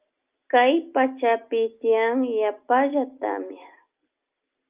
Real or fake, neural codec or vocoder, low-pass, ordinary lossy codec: real; none; 3.6 kHz; Opus, 24 kbps